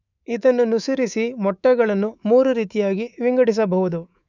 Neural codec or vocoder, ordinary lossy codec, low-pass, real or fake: codec, 24 kHz, 3.1 kbps, DualCodec; none; 7.2 kHz; fake